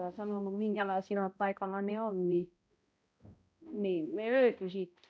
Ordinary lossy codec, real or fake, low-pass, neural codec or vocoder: none; fake; none; codec, 16 kHz, 0.5 kbps, X-Codec, HuBERT features, trained on balanced general audio